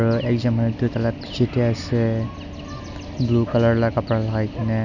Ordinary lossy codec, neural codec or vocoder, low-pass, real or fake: none; none; 7.2 kHz; real